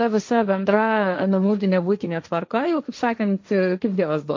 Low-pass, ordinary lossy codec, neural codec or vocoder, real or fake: 7.2 kHz; MP3, 48 kbps; codec, 16 kHz, 1.1 kbps, Voila-Tokenizer; fake